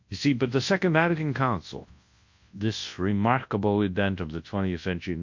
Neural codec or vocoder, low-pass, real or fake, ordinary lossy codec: codec, 24 kHz, 0.9 kbps, WavTokenizer, large speech release; 7.2 kHz; fake; MP3, 48 kbps